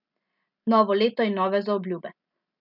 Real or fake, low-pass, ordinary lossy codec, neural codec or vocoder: real; 5.4 kHz; none; none